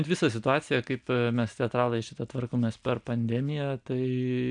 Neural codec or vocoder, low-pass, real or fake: none; 9.9 kHz; real